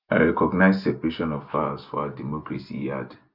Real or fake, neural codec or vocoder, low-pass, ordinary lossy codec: real; none; 5.4 kHz; none